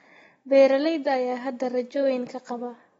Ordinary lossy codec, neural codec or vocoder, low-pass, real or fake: AAC, 24 kbps; none; 10.8 kHz; real